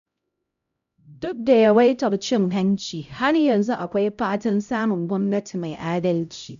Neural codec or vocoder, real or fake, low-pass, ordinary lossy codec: codec, 16 kHz, 0.5 kbps, X-Codec, HuBERT features, trained on LibriSpeech; fake; 7.2 kHz; none